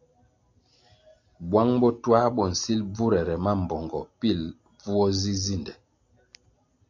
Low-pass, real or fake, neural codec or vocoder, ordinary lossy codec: 7.2 kHz; real; none; AAC, 48 kbps